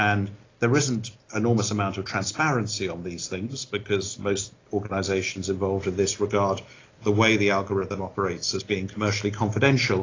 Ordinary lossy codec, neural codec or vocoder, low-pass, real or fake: AAC, 32 kbps; none; 7.2 kHz; real